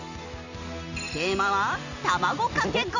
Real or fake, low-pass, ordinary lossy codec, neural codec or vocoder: real; 7.2 kHz; none; none